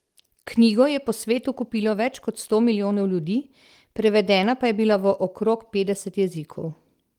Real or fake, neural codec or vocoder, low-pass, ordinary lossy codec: real; none; 19.8 kHz; Opus, 24 kbps